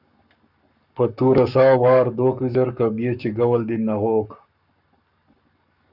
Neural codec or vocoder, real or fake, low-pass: codec, 44.1 kHz, 7.8 kbps, Pupu-Codec; fake; 5.4 kHz